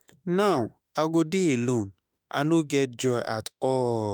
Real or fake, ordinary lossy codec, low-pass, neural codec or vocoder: fake; none; none; autoencoder, 48 kHz, 32 numbers a frame, DAC-VAE, trained on Japanese speech